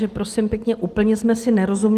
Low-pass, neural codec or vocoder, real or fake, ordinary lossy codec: 14.4 kHz; none; real; Opus, 24 kbps